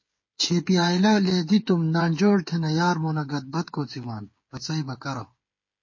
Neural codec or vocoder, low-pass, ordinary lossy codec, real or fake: codec, 16 kHz, 8 kbps, FreqCodec, smaller model; 7.2 kHz; MP3, 32 kbps; fake